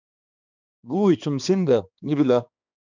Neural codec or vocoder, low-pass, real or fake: codec, 16 kHz, 2 kbps, X-Codec, HuBERT features, trained on balanced general audio; 7.2 kHz; fake